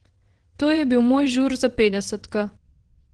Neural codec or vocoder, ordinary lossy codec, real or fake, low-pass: vocoder, 22.05 kHz, 80 mel bands, WaveNeXt; Opus, 16 kbps; fake; 9.9 kHz